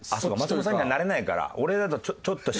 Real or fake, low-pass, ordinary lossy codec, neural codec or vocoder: real; none; none; none